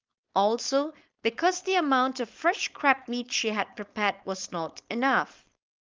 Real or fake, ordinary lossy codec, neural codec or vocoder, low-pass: fake; Opus, 24 kbps; codec, 16 kHz, 4.8 kbps, FACodec; 7.2 kHz